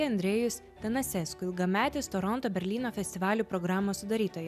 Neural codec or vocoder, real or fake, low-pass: none; real; 14.4 kHz